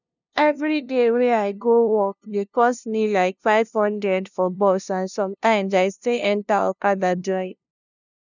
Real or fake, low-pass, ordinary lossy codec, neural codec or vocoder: fake; 7.2 kHz; none; codec, 16 kHz, 0.5 kbps, FunCodec, trained on LibriTTS, 25 frames a second